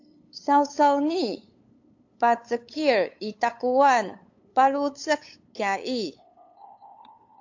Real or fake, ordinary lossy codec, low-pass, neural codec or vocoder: fake; AAC, 48 kbps; 7.2 kHz; codec, 16 kHz, 8 kbps, FunCodec, trained on LibriTTS, 25 frames a second